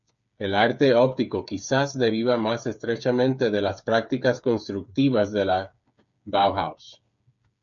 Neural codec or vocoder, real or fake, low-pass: codec, 16 kHz, 8 kbps, FreqCodec, smaller model; fake; 7.2 kHz